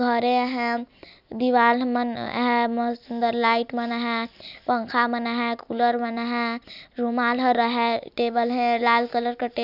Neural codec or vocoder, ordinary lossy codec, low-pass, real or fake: none; none; 5.4 kHz; real